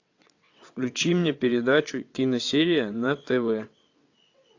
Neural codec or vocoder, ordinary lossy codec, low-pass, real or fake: vocoder, 22.05 kHz, 80 mel bands, WaveNeXt; AAC, 48 kbps; 7.2 kHz; fake